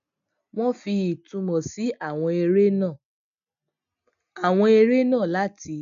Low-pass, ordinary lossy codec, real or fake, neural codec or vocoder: 7.2 kHz; none; real; none